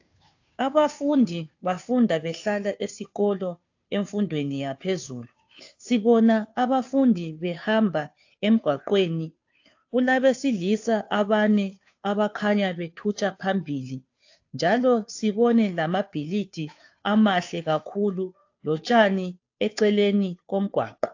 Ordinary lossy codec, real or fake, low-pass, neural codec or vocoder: AAC, 48 kbps; fake; 7.2 kHz; codec, 16 kHz, 2 kbps, FunCodec, trained on Chinese and English, 25 frames a second